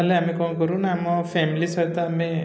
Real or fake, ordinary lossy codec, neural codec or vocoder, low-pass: real; none; none; none